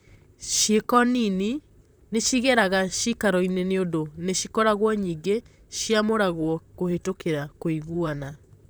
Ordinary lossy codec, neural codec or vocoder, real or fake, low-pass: none; vocoder, 44.1 kHz, 128 mel bands, Pupu-Vocoder; fake; none